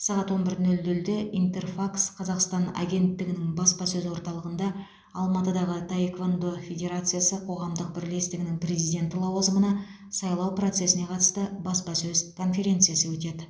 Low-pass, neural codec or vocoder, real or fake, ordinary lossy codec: none; none; real; none